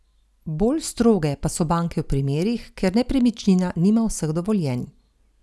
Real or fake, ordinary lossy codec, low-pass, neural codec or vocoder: real; none; none; none